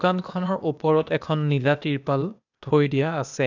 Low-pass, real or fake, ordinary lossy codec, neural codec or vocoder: 7.2 kHz; fake; none; codec, 16 kHz, 0.8 kbps, ZipCodec